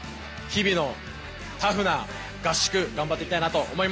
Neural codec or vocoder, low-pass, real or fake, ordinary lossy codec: none; none; real; none